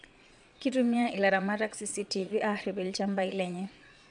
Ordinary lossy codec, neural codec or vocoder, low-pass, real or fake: none; vocoder, 22.05 kHz, 80 mel bands, Vocos; 9.9 kHz; fake